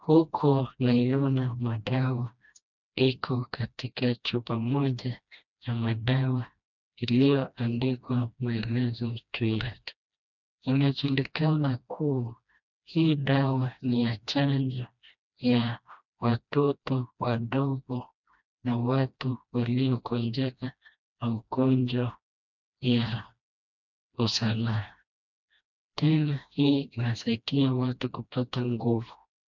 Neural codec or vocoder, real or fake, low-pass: codec, 16 kHz, 1 kbps, FreqCodec, smaller model; fake; 7.2 kHz